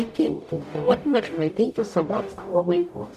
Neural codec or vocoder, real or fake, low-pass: codec, 44.1 kHz, 0.9 kbps, DAC; fake; 14.4 kHz